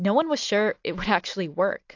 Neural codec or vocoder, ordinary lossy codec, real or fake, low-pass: none; AAC, 48 kbps; real; 7.2 kHz